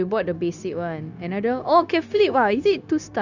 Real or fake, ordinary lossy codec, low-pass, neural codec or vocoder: fake; none; 7.2 kHz; codec, 16 kHz, 0.9 kbps, LongCat-Audio-Codec